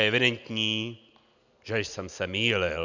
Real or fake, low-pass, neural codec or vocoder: real; 7.2 kHz; none